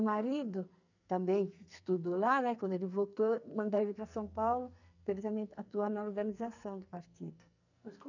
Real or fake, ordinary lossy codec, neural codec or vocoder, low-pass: fake; none; codec, 44.1 kHz, 2.6 kbps, SNAC; 7.2 kHz